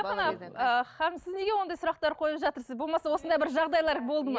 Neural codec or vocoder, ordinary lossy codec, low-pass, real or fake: none; none; none; real